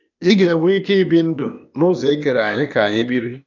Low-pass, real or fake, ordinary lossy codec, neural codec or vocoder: 7.2 kHz; fake; none; codec, 16 kHz, 0.8 kbps, ZipCodec